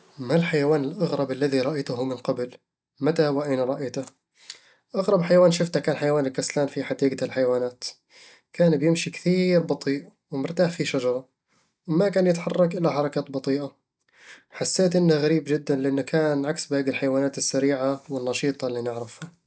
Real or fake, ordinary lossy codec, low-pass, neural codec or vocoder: real; none; none; none